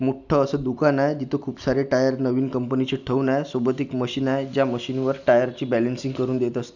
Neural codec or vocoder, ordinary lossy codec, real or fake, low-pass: none; none; real; 7.2 kHz